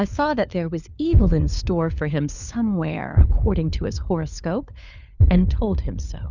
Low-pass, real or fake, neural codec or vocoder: 7.2 kHz; fake; codec, 16 kHz, 4 kbps, FunCodec, trained on LibriTTS, 50 frames a second